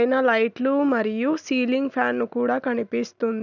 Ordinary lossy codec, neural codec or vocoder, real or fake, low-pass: none; none; real; 7.2 kHz